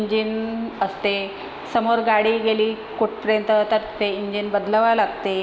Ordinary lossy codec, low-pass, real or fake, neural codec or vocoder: none; none; real; none